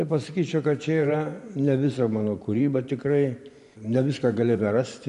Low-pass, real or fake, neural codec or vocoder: 10.8 kHz; fake; vocoder, 24 kHz, 100 mel bands, Vocos